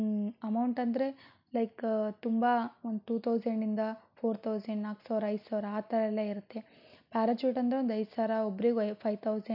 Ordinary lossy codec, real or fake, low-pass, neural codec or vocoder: none; real; 5.4 kHz; none